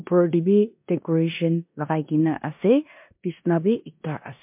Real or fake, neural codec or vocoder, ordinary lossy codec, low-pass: fake; codec, 16 kHz in and 24 kHz out, 0.9 kbps, LongCat-Audio-Codec, four codebook decoder; MP3, 32 kbps; 3.6 kHz